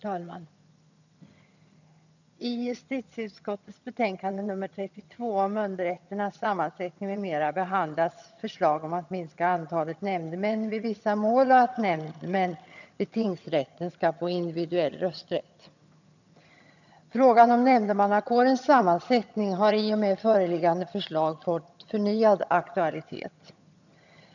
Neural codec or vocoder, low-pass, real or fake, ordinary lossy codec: vocoder, 22.05 kHz, 80 mel bands, HiFi-GAN; 7.2 kHz; fake; none